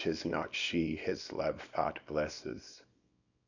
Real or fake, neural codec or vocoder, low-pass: fake; codec, 24 kHz, 0.9 kbps, WavTokenizer, small release; 7.2 kHz